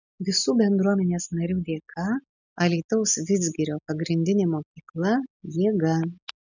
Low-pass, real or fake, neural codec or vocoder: 7.2 kHz; real; none